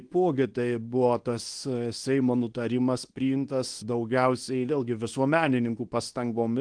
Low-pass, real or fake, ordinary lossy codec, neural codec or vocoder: 9.9 kHz; fake; Opus, 24 kbps; codec, 24 kHz, 0.9 kbps, WavTokenizer, medium speech release version 2